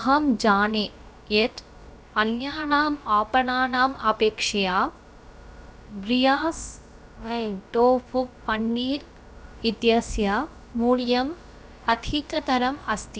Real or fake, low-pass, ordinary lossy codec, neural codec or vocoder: fake; none; none; codec, 16 kHz, about 1 kbps, DyCAST, with the encoder's durations